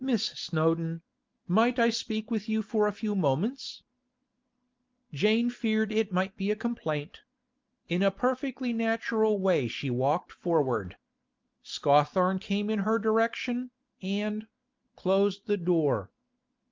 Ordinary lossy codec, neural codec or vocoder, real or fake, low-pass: Opus, 24 kbps; none; real; 7.2 kHz